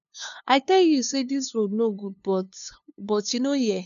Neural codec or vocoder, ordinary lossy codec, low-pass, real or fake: codec, 16 kHz, 2 kbps, FunCodec, trained on LibriTTS, 25 frames a second; none; 7.2 kHz; fake